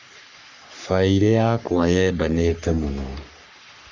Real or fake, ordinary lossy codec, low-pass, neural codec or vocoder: fake; Opus, 64 kbps; 7.2 kHz; codec, 44.1 kHz, 3.4 kbps, Pupu-Codec